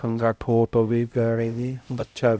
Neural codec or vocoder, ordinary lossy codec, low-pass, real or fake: codec, 16 kHz, 0.5 kbps, X-Codec, HuBERT features, trained on LibriSpeech; none; none; fake